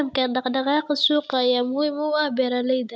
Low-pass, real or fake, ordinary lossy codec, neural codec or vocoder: none; real; none; none